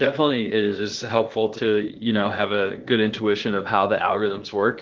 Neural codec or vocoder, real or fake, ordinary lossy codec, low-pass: codec, 16 kHz, 0.8 kbps, ZipCodec; fake; Opus, 16 kbps; 7.2 kHz